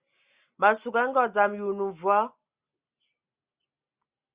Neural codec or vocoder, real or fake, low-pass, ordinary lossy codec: none; real; 3.6 kHz; Opus, 64 kbps